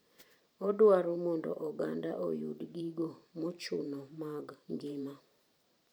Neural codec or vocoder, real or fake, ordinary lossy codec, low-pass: vocoder, 44.1 kHz, 128 mel bands every 512 samples, BigVGAN v2; fake; none; none